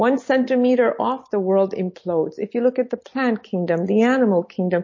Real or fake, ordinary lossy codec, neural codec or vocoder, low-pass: real; MP3, 32 kbps; none; 7.2 kHz